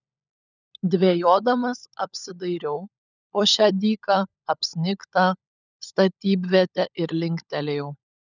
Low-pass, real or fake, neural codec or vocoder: 7.2 kHz; fake; codec, 16 kHz, 16 kbps, FunCodec, trained on LibriTTS, 50 frames a second